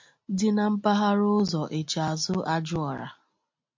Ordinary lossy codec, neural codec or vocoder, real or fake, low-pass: MP3, 48 kbps; none; real; 7.2 kHz